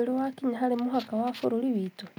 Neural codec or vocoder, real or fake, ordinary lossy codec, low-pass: none; real; none; none